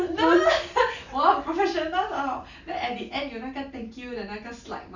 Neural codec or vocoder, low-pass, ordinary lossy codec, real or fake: none; 7.2 kHz; none; real